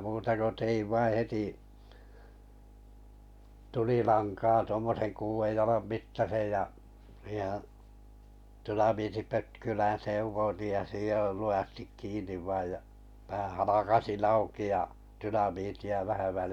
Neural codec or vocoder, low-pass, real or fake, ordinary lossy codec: none; 19.8 kHz; real; none